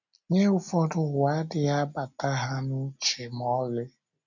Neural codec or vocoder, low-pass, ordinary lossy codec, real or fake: none; 7.2 kHz; none; real